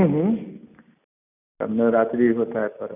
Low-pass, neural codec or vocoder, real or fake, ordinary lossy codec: 3.6 kHz; none; real; none